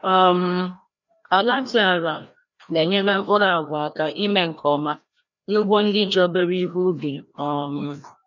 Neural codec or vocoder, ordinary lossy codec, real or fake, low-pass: codec, 16 kHz, 1 kbps, FreqCodec, larger model; none; fake; 7.2 kHz